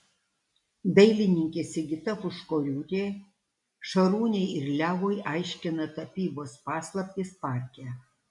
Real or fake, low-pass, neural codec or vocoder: real; 10.8 kHz; none